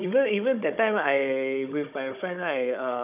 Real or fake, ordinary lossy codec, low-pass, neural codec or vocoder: fake; none; 3.6 kHz; codec, 16 kHz, 16 kbps, FunCodec, trained on Chinese and English, 50 frames a second